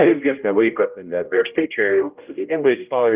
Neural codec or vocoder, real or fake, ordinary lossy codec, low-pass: codec, 16 kHz, 0.5 kbps, X-Codec, HuBERT features, trained on general audio; fake; Opus, 24 kbps; 3.6 kHz